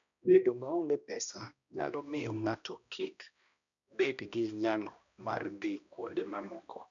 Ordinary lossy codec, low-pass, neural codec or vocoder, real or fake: none; 7.2 kHz; codec, 16 kHz, 1 kbps, X-Codec, HuBERT features, trained on balanced general audio; fake